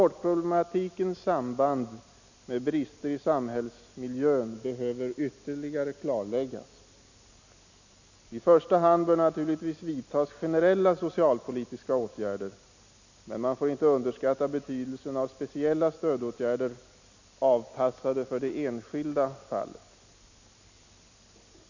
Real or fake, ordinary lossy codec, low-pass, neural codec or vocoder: real; none; 7.2 kHz; none